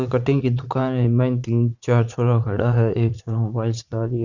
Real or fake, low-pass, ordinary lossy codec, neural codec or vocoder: fake; 7.2 kHz; none; vocoder, 44.1 kHz, 80 mel bands, Vocos